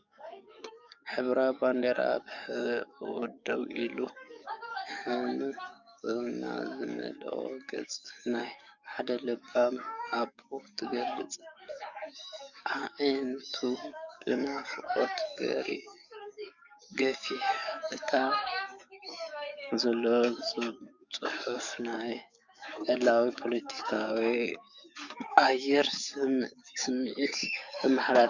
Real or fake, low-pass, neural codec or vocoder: fake; 7.2 kHz; codec, 44.1 kHz, 7.8 kbps, DAC